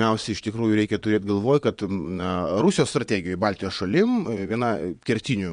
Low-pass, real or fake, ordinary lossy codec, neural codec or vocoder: 9.9 kHz; fake; MP3, 64 kbps; vocoder, 22.05 kHz, 80 mel bands, Vocos